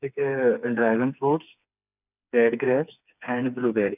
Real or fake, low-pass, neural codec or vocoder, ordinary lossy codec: fake; 3.6 kHz; codec, 16 kHz, 4 kbps, FreqCodec, smaller model; none